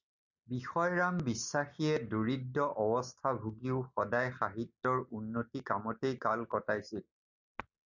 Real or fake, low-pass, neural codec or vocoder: real; 7.2 kHz; none